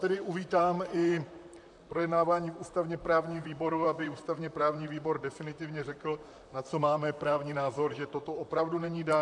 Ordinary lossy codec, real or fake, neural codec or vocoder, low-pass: AAC, 64 kbps; fake; vocoder, 44.1 kHz, 128 mel bands, Pupu-Vocoder; 10.8 kHz